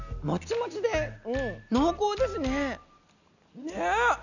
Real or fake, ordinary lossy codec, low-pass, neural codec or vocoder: real; none; 7.2 kHz; none